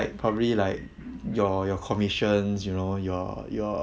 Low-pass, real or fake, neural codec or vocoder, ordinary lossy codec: none; real; none; none